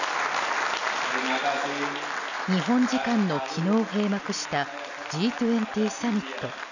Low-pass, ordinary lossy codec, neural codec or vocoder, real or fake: 7.2 kHz; none; none; real